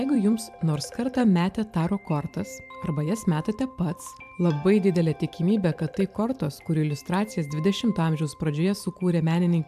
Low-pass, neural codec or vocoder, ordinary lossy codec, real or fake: 14.4 kHz; vocoder, 44.1 kHz, 128 mel bands every 512 samples, BigVGAN v2; AAC, 96 kbps; fake